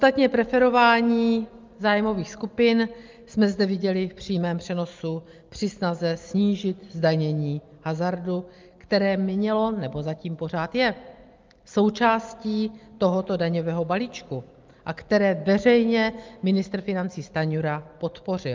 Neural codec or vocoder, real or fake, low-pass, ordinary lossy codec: none; real; 7.2 kHz; Opus, 32 kbps